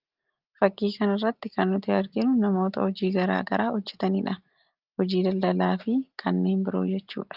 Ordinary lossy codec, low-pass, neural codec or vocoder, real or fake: Opus, 32 kbps; 5.4 kHz; none; real